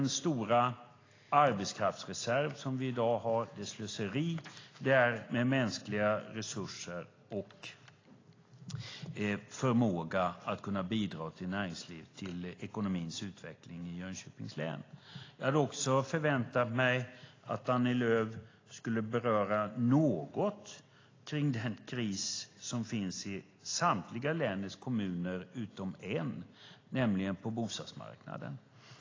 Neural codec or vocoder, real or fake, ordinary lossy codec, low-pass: none; real; AAC, 32 kbps; 7.2 kHz